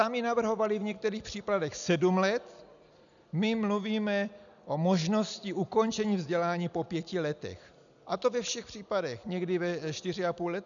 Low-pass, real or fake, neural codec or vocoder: 7.2 kHz; real; none